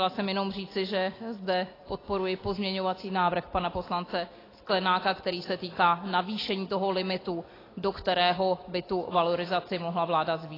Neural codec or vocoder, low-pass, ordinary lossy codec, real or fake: none; 5.4 kHz; AAC, 24 kbps; real